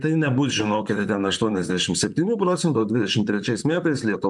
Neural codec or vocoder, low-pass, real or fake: vocoder, 44.1 kHz, 128 mel bands, Pupu-Vocoder; 10.8 kHz; fake